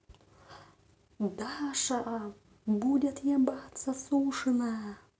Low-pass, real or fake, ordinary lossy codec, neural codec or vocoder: none; real; none; none